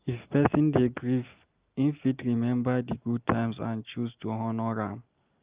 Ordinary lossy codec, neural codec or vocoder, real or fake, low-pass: Opus, 32 kbps; none; real; 3.6 kHz